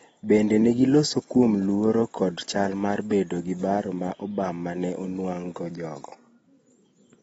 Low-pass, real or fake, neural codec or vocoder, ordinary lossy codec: 10.8 kHz; real; none; AAC, 24 kbps